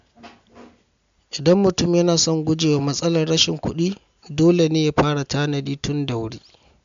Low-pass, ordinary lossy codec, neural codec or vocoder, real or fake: 7.2 kHz; MP3, 64 kbps; none; real